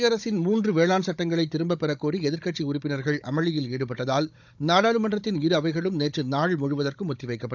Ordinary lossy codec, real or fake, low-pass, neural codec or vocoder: none; fake; 7.2 kHz; codec, 16 kHz, 16 kbps, FunCodec, trained on Chinese and English, 50 frames a second